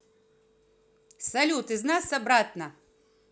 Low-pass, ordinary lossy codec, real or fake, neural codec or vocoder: none; none; real; none